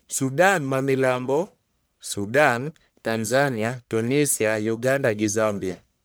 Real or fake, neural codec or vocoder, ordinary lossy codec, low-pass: fake; codec, 44.1 kHz, 1.7 kbps, Pupu-Codec; none; none